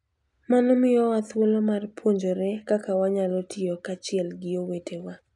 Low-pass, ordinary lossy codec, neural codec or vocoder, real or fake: 10.8 kHz; none; none; real